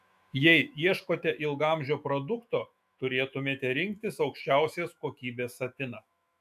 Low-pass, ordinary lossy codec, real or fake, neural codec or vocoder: 14.4 kHz; MP3, 96 kbps; fake; autoencoder, 48 kHz, 128 numbers a frame, DAC-VAE, trained on Japanese speech